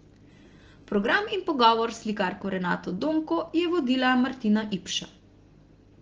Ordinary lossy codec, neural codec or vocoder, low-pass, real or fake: Opus, 16 kbps; none; 7.2 kHz; real